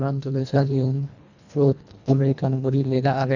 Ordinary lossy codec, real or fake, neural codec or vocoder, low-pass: none; fake; codec, 24 kHz, 1.5 kbps, HILCodec; 7.2 kHz